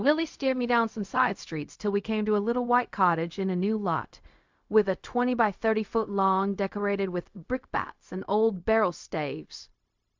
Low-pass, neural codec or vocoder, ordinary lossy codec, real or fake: 7.2 kHz; codec, 16 kHz, 0.4 kbps, LongCat-Audio-Codec; MP3, 64 kbps; fake